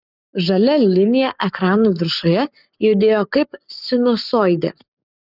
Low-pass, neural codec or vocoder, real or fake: 5.4 kHz; codec, 44.1 kHz, 7.8 kbps, Pupu-Codec; fake